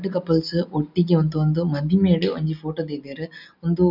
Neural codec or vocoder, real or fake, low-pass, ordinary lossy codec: none; real; 5.4 kHz; none